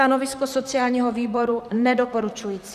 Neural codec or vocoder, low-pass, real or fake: vocoder, 44.1 kHz, 128 mel bands, Pupu-Vocoder; 14.4 kHz; fake